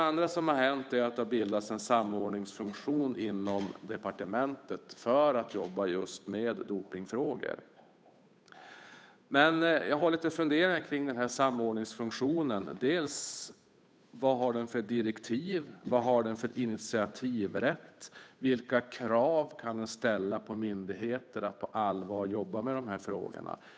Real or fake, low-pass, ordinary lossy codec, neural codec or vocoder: fake; none; none; codec, 16 kHz, 8 kbps, FunCodec, trained on Chinese and English, 25 frames a second